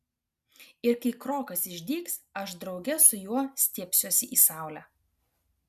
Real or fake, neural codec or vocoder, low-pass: real; none; 14.4 kHz